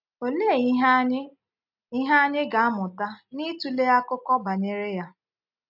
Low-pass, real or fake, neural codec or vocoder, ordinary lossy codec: 5.4 kHz; real; none; none